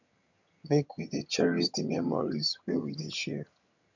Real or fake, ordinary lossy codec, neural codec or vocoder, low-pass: fake; none; vocoder, 22.05 kHz, 80 mel bands, HiFi-GAN; 7.2 kHz